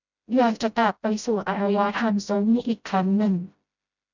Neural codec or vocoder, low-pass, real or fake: codec, 16 kHz, 0.5 kbps, FreqCodec, smaller model; 7.2 kHz; fake